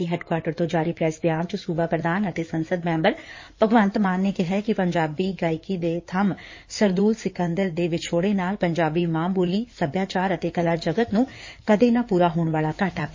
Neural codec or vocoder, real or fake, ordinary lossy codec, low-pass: codec, 16 kHz in and 24 kHz out, 2.2 kbps, FireRedTTS-2 codec; fake; MP3, 32 kbps; 7.2 kHz